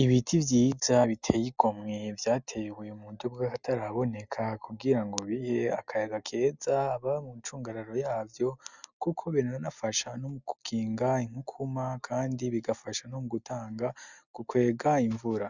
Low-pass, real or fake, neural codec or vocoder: 7.2 kHz; real; none